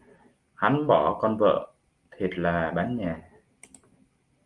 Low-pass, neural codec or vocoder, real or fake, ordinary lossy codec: 10.8 kHz; none; real; Opus, 32 kbps